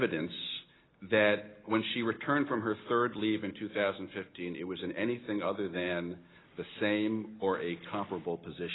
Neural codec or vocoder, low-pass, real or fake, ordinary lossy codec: none; 7.2 kHz; real; AAC, 16 kbps